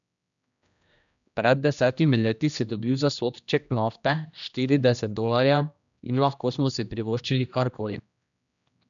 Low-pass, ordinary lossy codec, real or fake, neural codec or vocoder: 7.2 kHz; none; fake; codec, 16 kHz, 1 kbps, X-Codec, HuBERT features, trained on general audio